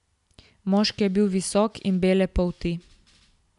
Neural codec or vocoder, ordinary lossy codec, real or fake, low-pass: none; none; real; 10.8 kHz